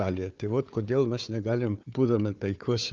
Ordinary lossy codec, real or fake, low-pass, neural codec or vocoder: Opus, 24 kbps; fake; 7.2 kHz; codec, 16 kHz, 4 kbps, FunCodec, trained on Chinese and English, 50 frames a second